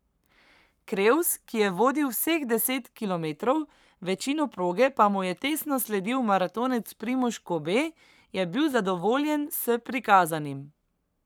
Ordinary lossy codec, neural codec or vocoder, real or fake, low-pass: none; codec, 44.1 kHz, 7.8 kbps, Pupu-Codec; fake; none